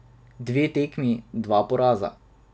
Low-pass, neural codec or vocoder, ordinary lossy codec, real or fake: none; none; none; real